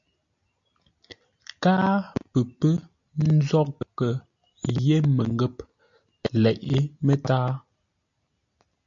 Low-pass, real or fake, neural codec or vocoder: 7.2 kHz; real; none